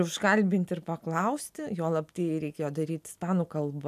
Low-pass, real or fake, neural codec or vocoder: 14.4 kHz; real; none